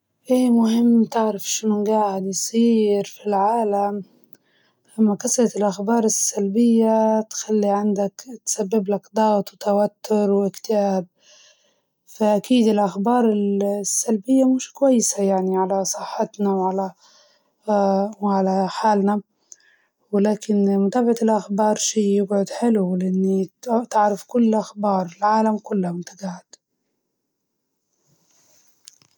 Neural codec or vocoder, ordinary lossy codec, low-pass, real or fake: none; none; none; real